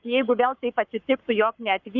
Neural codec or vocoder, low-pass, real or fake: codec, 44.1 kHz, 7.8 kbps, Pupu-Codec; 7.2 kHz; fake